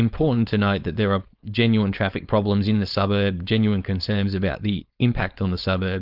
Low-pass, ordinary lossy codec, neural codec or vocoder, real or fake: 5.4 kHz; Opus, 24 kbps; codec, 16 kHz, 4.8 kbps, FACodec; fake